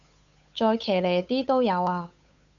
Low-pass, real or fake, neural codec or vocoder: 7.2 kHz; fake; codec, 16 kHz, 8 kbps, FunCodec, trained on Chinese and English, 25 frames a second